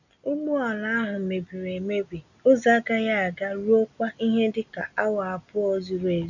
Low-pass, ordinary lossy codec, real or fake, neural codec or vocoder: 7.2 kHz; none; real; none